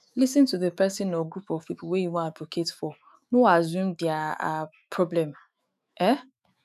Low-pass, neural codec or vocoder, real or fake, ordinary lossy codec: 14.4 kHz; autoencoder, 48 kHz, 128 numbers a frame, DAC-VAE, trained on Japanese speech; fake; none